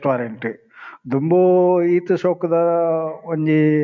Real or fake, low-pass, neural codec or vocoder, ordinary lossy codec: real; 7.2 kHz; none; MP3, 64 kbps